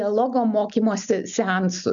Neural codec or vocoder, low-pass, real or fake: none; 7.2 kHz; real